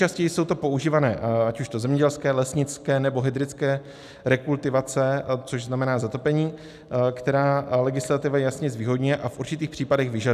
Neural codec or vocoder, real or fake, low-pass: none; real; 14.4 kHz